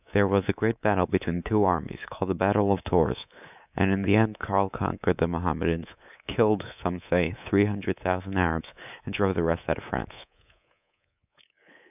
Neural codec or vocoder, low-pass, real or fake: codec, 24 kHz, 3.1 kbps, DualCodec; 3.6 kHz; fake